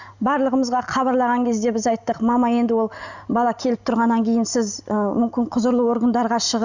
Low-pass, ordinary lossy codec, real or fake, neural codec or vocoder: 7.2 kHz; none; real; none